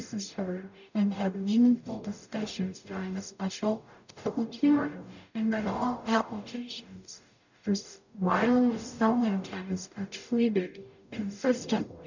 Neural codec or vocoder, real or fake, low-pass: codec, 44.1 kHz, 0.9 kbps, DAC; fake; 7.2 kHz